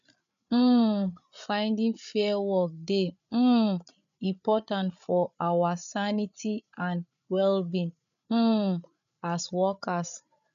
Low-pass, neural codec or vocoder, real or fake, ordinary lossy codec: 7.2 kHz; codec, 16 kHz, 8 kbps, FreqCodec, larger model; fake; none